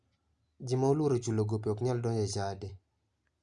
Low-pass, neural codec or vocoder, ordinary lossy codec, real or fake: 9.9 kHz; none; Opus, 64 kbps; real